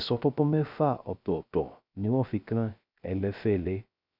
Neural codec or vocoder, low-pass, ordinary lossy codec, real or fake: codec, 16 kHz, 0.3 kbps, FocalCodec; 5.4 kHz; none; fake